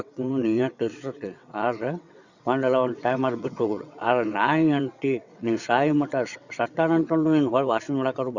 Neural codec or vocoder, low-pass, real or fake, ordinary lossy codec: vocoder, 22.05 kHz, 80 mel bands, WaveNeXt; 7.2 kHz; fake; Opus, 64 kbps